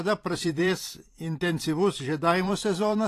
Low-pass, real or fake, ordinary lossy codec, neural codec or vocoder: 14.4 kHz; fake; AAC, 48 kbps; vocoder, 44.1 kHz, 128 mel bands every 512 samples, BigVGAN v2